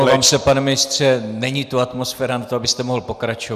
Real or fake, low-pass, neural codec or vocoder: real; 14.4 kHz; none